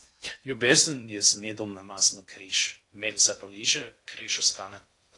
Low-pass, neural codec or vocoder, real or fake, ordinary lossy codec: 10.8 kHz; codec, 16 kHz in and 24 kHz out, 0.6 kbps, FocalCodec, streaming, 2048 codes; fake; AAC, 48 kbps